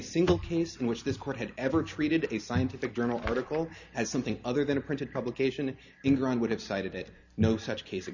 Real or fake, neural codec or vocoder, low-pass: real; none; 7.2 kHz